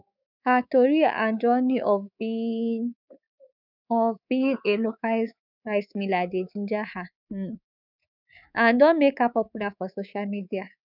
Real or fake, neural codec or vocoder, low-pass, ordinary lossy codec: fake; codec, 24 kHz, 3.1 kbps, DualCodec; 5.4 kHz; none